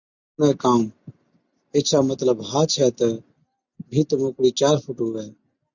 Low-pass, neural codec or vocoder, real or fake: 7.2 kHz; none; real